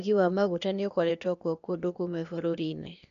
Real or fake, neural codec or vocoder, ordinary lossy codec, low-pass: fake; codec, 16 kHz, 0.8 kbps, ZipCodec; none; 7.2 kHz